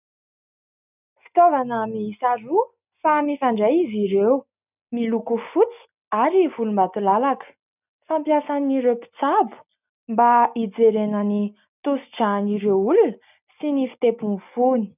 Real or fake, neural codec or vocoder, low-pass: real; none; 3.6 kHz